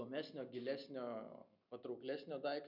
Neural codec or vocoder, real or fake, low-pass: none; real; 5.4 kHz